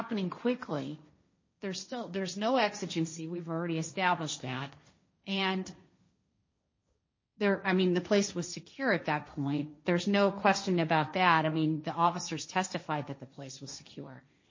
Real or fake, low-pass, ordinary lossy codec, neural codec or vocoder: fake; 7.2 kHz; MP3, 32 kbps; codec, 16 kHz, 1.1 kbps, Voila-Tokenizer